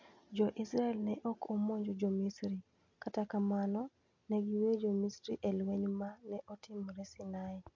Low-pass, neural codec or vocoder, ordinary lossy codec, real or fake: 7.2 kHz; none; MP3, 48 kbps; real